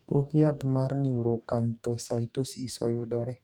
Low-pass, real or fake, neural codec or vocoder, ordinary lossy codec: 19.8 kHz; fake; codec, 44.1 kHz, 2.6 kbps, DAC; none